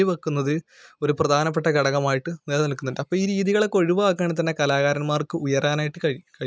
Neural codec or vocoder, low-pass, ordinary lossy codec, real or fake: none; none; none; real